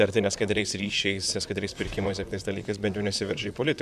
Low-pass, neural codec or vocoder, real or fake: 14.4 kHz; vocoder, 44.1 kHz, 128 mel bands, Pupu-Vocoder; fake